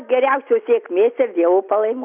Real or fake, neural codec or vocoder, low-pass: real; none; 3.6 kHz